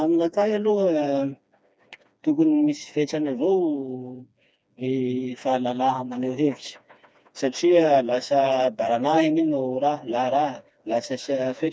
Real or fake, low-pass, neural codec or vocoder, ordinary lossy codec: fake; none; codec, 16 kHz, 2 kbps, FreqCodec, smaller model; none